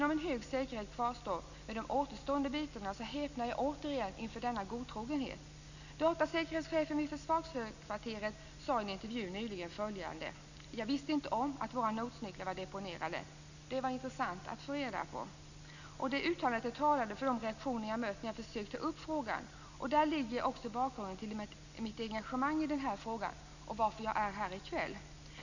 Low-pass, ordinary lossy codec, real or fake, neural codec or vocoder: 7.2 kHz; none; real; none